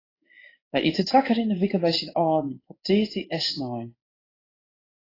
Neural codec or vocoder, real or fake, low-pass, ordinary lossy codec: codec, 16 kHz in and 24 kHz out, 1 kbps, XY-Tokenizer; fake; 5.4 kHz; AAC, 24 kbps